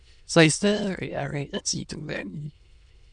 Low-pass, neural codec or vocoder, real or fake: 9.9 kHz; autoencoder, 22.05 kHz, a latent of 192 numbers a frame, VITS, trained on many speakers; fake